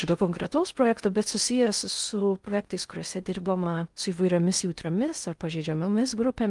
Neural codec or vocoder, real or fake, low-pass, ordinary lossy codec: codec, 16 kHz in and 24 kHz out, 0.6 kbps, FocalCodec, streaming, 2048 codes; fake; 10.8 kHz; Opus, 32 kbps